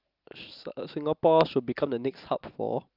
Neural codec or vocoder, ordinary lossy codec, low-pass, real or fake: none; Opus, 32 kbps; 5.4 kHz; real